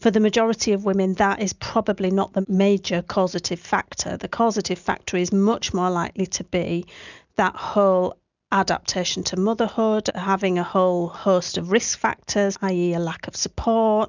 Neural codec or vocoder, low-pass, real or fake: none; 7.2 kHz; real